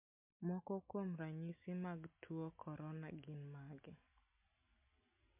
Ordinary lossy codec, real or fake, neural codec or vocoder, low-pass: MP3, 32 kbps; real; none; 3.6 kHz